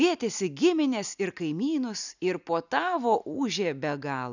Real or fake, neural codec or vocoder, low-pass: real; none; 7.2 kHz